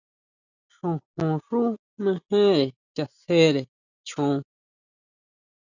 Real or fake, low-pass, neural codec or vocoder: real; 7.2 kHz; none